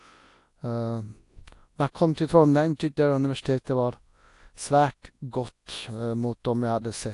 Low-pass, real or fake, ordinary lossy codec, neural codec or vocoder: 10.8 kHz; fake; AAC, 48 kbps; codec, 24 kHz, 0.9 kbps, WavTokenizer, large speech release